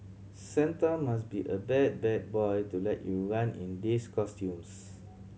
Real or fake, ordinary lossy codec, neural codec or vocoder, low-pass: real; none; none; none